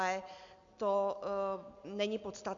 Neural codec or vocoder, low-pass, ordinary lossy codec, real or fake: none; 7.2 kHz; AAC, 64 kbps; real